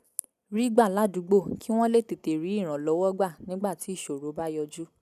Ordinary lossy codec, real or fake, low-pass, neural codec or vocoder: none; real; 14.4 kHz; none